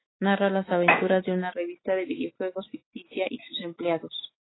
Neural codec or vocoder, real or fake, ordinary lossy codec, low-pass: autoencoder, 48 kHz, 128 numbers a frame, DAC-VAE, trained on Japanese speech; fake; AAC, 16 kbps; 7.2 kHz